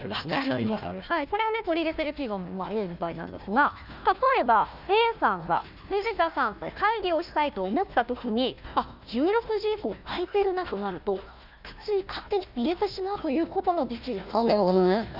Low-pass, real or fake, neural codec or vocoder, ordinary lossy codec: 5.4 kHz; fake; codec, 16 kHz, 1 kbps, FunCodec, trained on Chinese and English, 50 frames a second; none